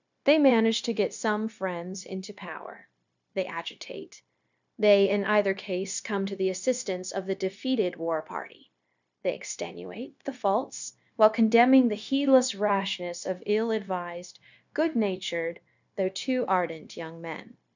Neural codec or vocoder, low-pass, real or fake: codec, 16 kHz, 0.9 kbps, LongCat-Audio-Codec; 7.2 kHz; fake